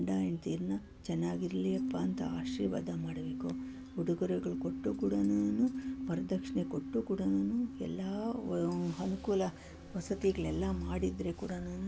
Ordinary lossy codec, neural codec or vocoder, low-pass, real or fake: none; none; none; real